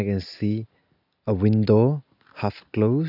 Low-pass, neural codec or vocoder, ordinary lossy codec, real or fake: 5.4 kHz; none; none; real